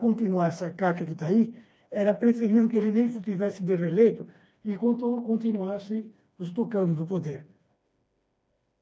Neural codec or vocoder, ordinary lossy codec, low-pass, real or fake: codec, 16 kHz, 2 kbps, FreqCodec, smaller model; none; none; fake